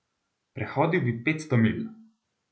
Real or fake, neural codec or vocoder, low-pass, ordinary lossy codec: real; none; none; none